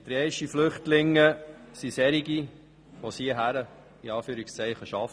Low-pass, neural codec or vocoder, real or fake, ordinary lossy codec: none; none; real; none